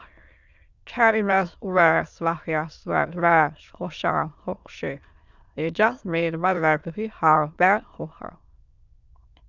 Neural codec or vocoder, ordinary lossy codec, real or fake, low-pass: autoencoder, 22.05 kHz, a latent of 192 numbers a frame, VITS, trained on many speakers; Opus, 64 kbps; fake; 7.2 kHz